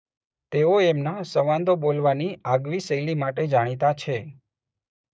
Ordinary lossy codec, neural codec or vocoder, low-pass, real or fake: none; codec, 16 kHz, 6 kbps, DAC; none; fake